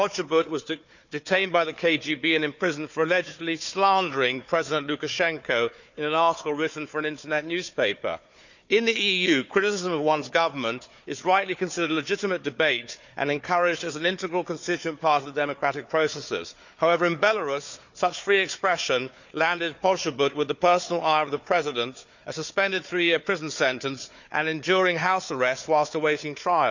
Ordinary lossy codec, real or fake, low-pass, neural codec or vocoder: none; fake; 7.2 kHz; codec, 16 kHz, 4 kbps, FunCodec, trained on Chinese and English, 50 frames a second